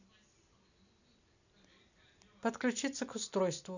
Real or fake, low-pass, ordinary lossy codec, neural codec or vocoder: real; 7.2 kHz; none; none